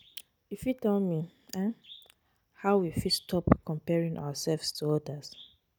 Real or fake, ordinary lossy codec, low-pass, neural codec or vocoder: real; none; none; none